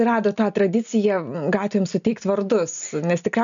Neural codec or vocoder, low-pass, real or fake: none; 7.2 kHz; real